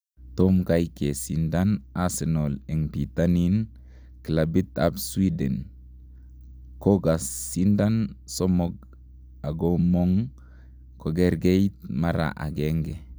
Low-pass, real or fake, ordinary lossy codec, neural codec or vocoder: none; real; none; none